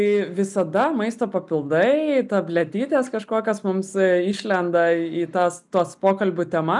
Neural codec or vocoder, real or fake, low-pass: none; real; 10.8 kHz